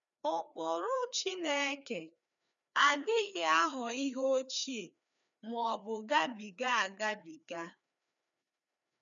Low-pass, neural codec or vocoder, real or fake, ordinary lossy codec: 7.2 kHz; codec, 16 kHz, 2 kbps, FreqCodec, larger model; fake; none